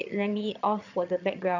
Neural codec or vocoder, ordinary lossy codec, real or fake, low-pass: vocoder, 22.05 kHz, 80 mel bands, HiFi-GAN; none; fake; 7.2 kHz